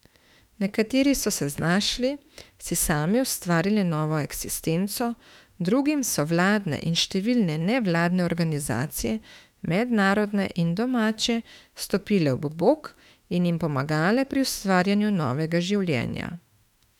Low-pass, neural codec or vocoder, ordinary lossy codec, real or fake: 19.8 kHz; autoencoder, 48 kHz, 32 numbers a frame, DAC-VAE, trained on Japanese speech; none; fake